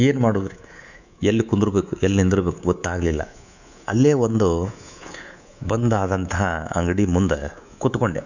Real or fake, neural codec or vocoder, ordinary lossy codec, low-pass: fake; codec, 24 kHz, 3.1 kbps, DualCodec; none; 7.2 kHz